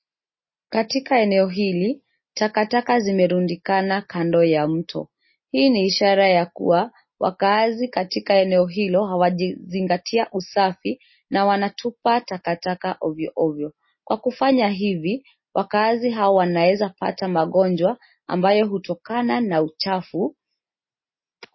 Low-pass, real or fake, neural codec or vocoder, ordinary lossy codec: 7.2 kHz; real; none; MP3, 24 kbps